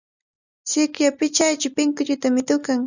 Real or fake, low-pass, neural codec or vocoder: real; 7.2 kHz; none